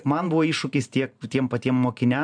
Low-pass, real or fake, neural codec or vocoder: 9.9 kHz; fake; vocoder, 44.1 kHz, 128 mel bands every 512 samples, BigVGAN v2